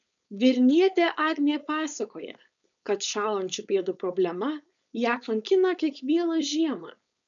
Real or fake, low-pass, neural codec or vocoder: fake; 7.2 kHz; codec, 16 kHz, 4.8 kbps, FACodec